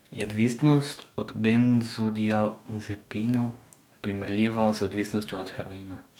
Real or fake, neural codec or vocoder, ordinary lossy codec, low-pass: fake; codec, 44.1 kHz, 2.6 kbps, DAC; none; 19.8 kHz